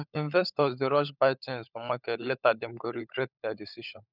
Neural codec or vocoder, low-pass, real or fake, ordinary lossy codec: codec, 16 kHz, 16 kbps, FunCodec, trained on LibriTTS, 50 frames a second; 5.4 kHz; fake; none